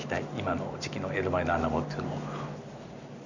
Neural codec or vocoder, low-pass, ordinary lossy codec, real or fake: none; 7.2 kHz; none; real